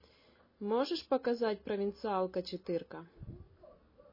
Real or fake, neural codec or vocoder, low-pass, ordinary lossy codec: real; none; 5.4 kHz; MP3, 24 kbps